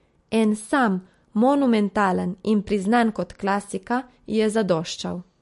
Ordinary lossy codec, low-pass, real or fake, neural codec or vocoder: MP3, 48 kbps; 14.4 kHz; real; none